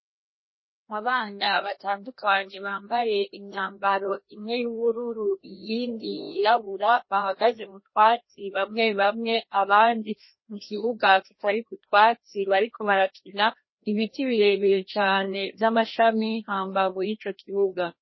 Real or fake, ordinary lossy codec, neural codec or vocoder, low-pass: fake; MP3, 24 kbps; codec, 16 kHz, 1 kbps, FreqCodec, larger model; 7.2 kHz